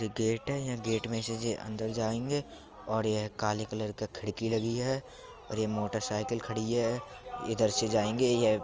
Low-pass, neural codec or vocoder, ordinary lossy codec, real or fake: 7.2 kHz; none; Opus, 24 kbps; real